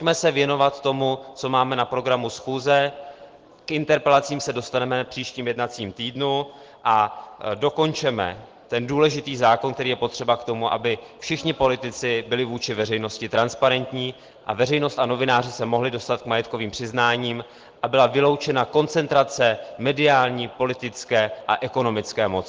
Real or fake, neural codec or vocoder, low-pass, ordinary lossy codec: real; none; 7.2 kHz; Opus, 16 kbps